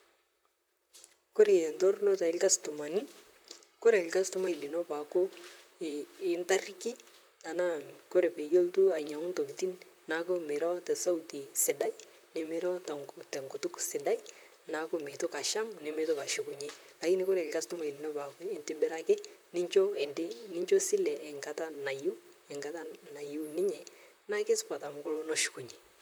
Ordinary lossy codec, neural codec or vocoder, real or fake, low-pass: none; vocoder, 44.1 kHz, 128 mel bands, Pupu-Vocoder; fake; none